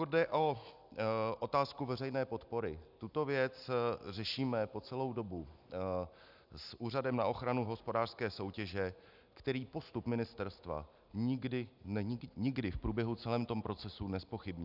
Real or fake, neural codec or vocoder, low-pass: real; none; 5.4 kHz